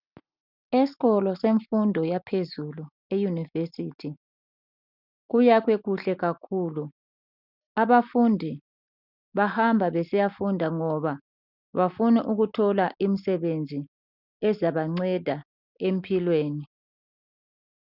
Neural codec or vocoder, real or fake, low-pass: none; real; 5.4 kHz